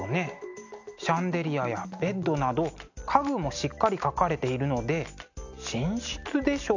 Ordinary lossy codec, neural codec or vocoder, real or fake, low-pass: none; none; real; 7.2 kHz